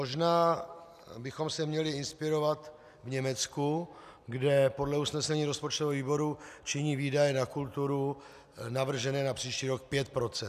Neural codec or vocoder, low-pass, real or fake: none; 14.4 kHz; real